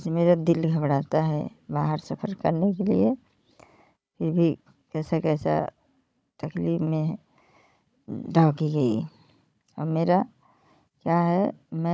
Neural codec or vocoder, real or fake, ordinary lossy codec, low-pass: codec, 16 kHz, 16 kbps, FunCodec, trained on Chinese and English, 50 frames a second; fake; none; none